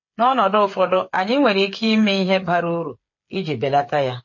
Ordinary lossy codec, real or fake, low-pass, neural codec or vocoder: MP3, 32 kbps; fake; 7.2 kHz; codec, 16 kHz, 8 kbps, FreqCodec, smaller model